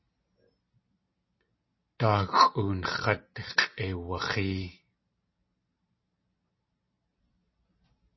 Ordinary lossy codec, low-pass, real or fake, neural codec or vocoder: MP3, 24 kbps; 7.2 kHz; real; none